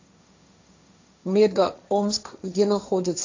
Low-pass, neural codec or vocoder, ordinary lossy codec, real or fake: 7.2 kHz; codec, 16 kHz, 1.1 kbps, Voila-Tokenizer; AAC, 48 kbps; fake